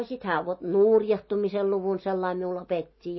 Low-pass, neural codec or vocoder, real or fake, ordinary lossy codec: 7.2 kHz; none; real; MP3, 24 kbps